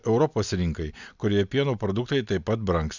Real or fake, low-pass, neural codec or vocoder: real; 7.2 kHz; none